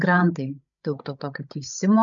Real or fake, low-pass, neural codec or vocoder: fake; 7.2 kHz; codec, 16 kHz, 16 kbps, FreqCodec, larger model